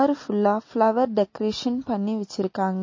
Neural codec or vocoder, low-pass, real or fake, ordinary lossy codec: none; 7.2 kHz; real; MP3, 32 kbps